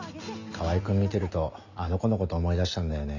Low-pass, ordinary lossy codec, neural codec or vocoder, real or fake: 7.2 kHz; none; none; real